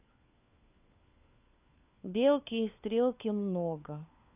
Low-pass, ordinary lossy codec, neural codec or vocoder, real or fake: 3.6 kHz; none; codec, 16 kHz, 4 kbps, FunCodec, trained on LibriTTS, 50 frames a second; fake